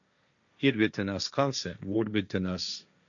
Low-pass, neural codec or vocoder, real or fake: 7.2 kHz; codec, 16 kHz, 1.1 kbps, Voila-Tokenizer; fake